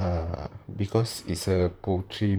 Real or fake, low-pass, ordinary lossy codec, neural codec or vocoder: fake; none; none; vocoder, 44.1 kHz, 128 mel bands, Pupu-Vocoder